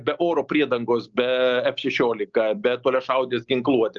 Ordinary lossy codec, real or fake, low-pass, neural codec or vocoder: Opus, 24 kbps; real; 7.2 kHz; none